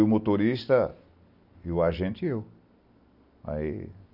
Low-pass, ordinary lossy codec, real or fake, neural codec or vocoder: 5.4 kHz; none; real; none